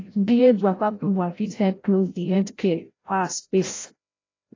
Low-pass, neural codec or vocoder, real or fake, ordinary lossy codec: 7.2 kHz; codec, 16 kHz, 0.5 kbps, FreqCodec, larger model; fake; AAC, 32 kbps